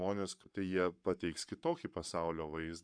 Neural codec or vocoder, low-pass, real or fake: codec, 44.1 kHz, 7.8 kbps, Pupu-Codec; 9.9 kHz; fake